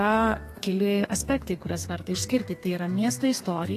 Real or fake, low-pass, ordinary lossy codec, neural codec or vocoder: fake; 14.4 kHz; AAC, 48 kbps; codec, 32 kHz, 1.9 kbps, SNAC